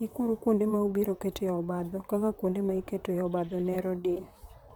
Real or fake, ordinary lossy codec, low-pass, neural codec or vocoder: fake; none; 19.8 kHz; vocoder, 44.1 kHz, 128 mel bands, Pupu-Vocoder